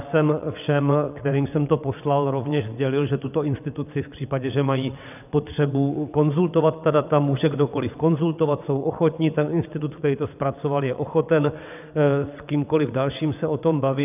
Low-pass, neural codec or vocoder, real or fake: 3.6 kHz; vocoder, 22.05 kHz, 80 mel bands, WaveNeXt; fake